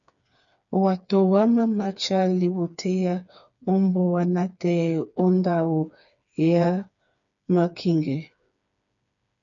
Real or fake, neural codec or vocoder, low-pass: fake; codec, 16 kHz, 4 kbps, FreqCodec, smaller model; 7.2 kHz